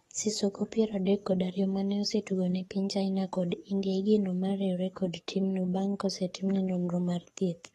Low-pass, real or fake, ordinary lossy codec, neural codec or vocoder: 19.8 kHz; fake; AAC, 32 kbps; codec, 44.1 kHz, 7.8 kbps, DAC